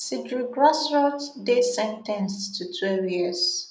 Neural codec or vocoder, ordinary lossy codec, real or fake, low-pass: none; none; real; none